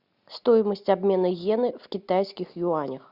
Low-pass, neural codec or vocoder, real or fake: 5.4 kHz; none; real